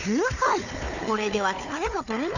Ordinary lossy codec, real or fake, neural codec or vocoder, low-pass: none; fake; codec, 16 kHz, 4 kbps, FunCodec, trained on Chinese and English, 50 frames a second; 7.2 kHz